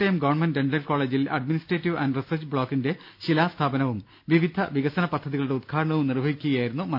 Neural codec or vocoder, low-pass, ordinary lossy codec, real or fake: none; 5.4 kHz; none; real